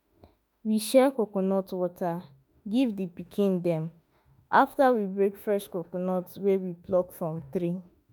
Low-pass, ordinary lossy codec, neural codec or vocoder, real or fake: none; none; autoencoder, 48 kHz, 32 numbers a frame, DAC-VAE, trained on Japanese speech; fake